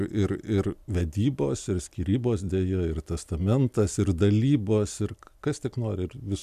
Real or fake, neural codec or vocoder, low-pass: real; none; 14.4 kHz